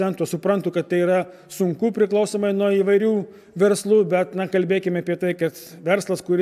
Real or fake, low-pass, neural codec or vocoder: real; 14.4 kHz; none